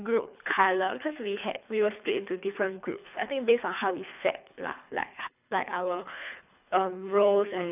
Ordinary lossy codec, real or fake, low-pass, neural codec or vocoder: none; fake; 3.6 kHz; codec, 24 kHz, 3 kbps, HILCodec